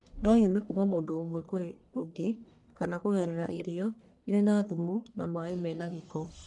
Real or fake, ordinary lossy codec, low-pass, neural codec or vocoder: fake; none; 10.8 kHz; codec, 44.1 kHz, 1.7 kbps, Pupu-Codec